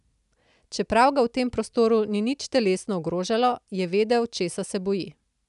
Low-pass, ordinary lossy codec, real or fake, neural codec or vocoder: 10.8 kHz; none; real; none